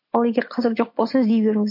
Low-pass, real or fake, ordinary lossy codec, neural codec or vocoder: 5.4 kHz; real; MP3, 32 kbps; none